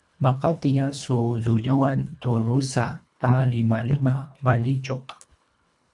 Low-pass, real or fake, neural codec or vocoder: 10.8 kHz; fake; codec, 24 kHz, 1.5 kbps, HILCodec